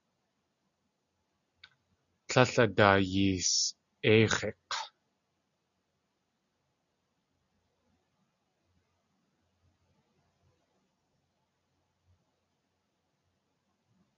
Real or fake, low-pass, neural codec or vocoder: real; 7.2 kHz; none